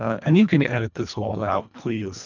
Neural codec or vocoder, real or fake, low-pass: codec, 24 kHz, 1.5 kbps, HILCodec; fake; 7.2 kHz